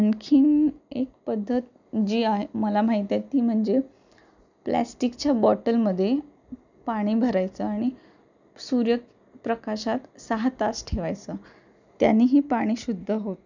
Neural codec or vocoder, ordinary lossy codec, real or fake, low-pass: none; none; real; 7.2 kHz